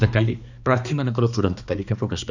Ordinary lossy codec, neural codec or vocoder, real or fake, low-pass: none; codec, 16 kHz, 2 kbps, X-Codec, HuBERT features, trained on balanced general audio; fake; 7.2 kHz